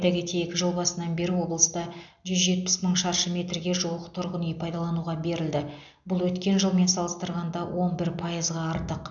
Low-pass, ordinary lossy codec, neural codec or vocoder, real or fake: 7.2 kHz; none; none; real